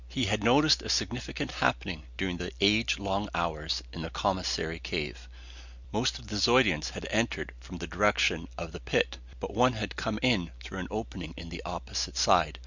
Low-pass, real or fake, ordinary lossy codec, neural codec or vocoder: 7.2 kHz; real; Opus, 64 kbps; none